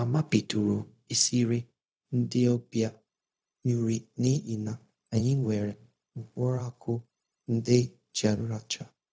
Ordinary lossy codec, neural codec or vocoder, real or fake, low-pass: none; codec, 16 kHz, 0.4 kbps, LongCat-Audio-Codec; fake; none